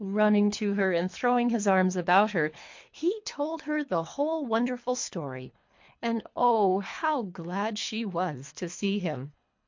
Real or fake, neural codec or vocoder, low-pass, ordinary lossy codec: fake; codec, 24 kHz, 3 kbps, HILCodec; 7.2 kHz; MP3, 48 kbps